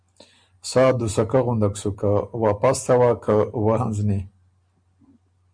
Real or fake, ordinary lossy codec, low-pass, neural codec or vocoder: real; Opus, 64 kbps; 9.9 kHz; none